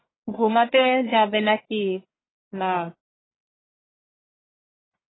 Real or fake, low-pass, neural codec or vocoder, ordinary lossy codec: fake; 7.2 kHz; codec, 44.1 kHz, 1.7 kbps, Pupu-Codec; AAC, 16 kbps